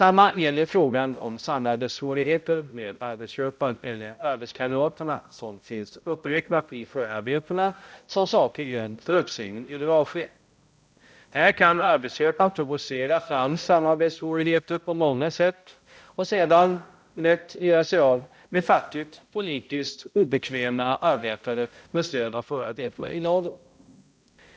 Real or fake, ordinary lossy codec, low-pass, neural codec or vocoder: fake; none; none; codec, 16 kHz, 0.5 kbps, X-Codec, HuBERT features, trained on balanced general audio